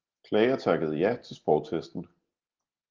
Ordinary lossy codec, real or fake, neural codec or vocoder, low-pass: Opus, 16 kbps; real; none; 7.2 kHz